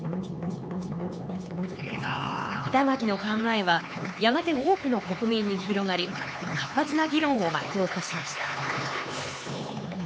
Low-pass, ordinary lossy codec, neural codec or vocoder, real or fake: none; none; codec, 16 kHz, 4 kbps, X-Codec, HuBERT features, trained on LibriSpeech; fake